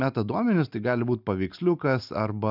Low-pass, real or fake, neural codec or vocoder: 5.4 kHz; real; none